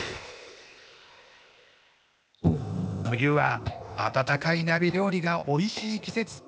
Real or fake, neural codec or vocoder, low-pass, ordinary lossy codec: fake; codec, 16 kHz, 0.8 kbps, ZipCodec; none; none